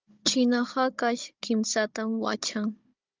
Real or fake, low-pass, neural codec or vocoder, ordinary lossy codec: fake; 7.2 kHz; codec, 16 kHz, 16 kbps, FunCodec, trained on Chinese and English, 50 frames a second; Opus, 24 kbps